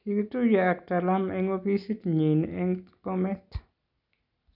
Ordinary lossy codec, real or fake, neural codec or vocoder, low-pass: none; real; none; 5.4 kHz